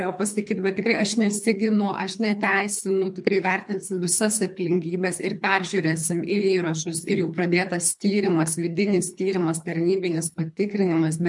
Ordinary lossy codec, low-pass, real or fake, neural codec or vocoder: MP3, 64 kbps; 10.8 kHz; fake; codec, 24 kHz, 3 kbps, HILCodec